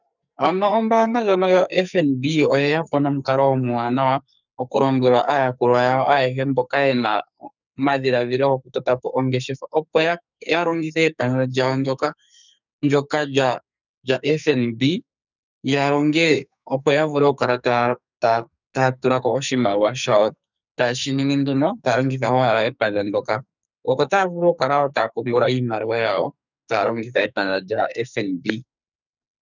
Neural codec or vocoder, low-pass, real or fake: codec, 44.1 kHz, 2.6 kbps, SNAC; 7.2 kHz; fake